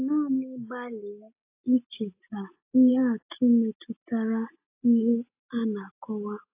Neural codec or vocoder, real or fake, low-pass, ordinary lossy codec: none; real; 3.6 kHz; AAC, 32 kbps